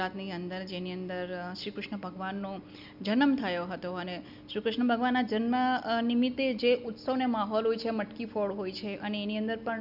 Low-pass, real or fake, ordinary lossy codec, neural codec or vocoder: 5.4 kHz; real; none; none